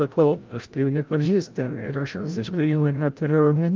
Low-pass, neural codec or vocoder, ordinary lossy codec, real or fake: 7.2 kHz; codec, 16 kHz, 0.5 kbps, FreqCodec, larger model; Opus, 24 kbps; fake